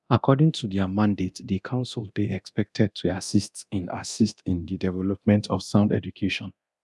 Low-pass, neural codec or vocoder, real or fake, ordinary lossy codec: none; codec, 24 kHz, 0.9 kbps, DualCodec; fake; none